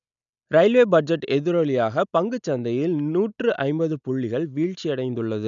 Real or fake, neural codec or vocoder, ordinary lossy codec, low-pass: real; none; none; 7.2 kHz